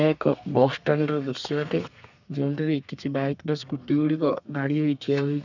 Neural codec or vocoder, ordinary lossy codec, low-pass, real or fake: codec, 32 kHz, 1.9 kbps, SNAC; none; 7.2 kHz; fake